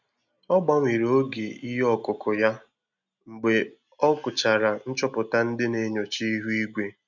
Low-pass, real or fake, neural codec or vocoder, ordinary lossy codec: 7.2 kHz; real; none; none